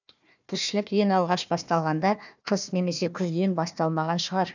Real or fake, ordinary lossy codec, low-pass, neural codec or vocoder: fake; none; 7.2 kHz; codec, 16 kHz, 1 kbps, FunCodec, trained on Chinese and English, 50 frames a second